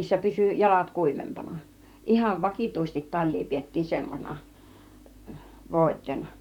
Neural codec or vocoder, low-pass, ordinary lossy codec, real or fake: vocoder, 44.1 kHz, 128 mel bands, Pupu-Vocoder; 19.8 kHz; none; fake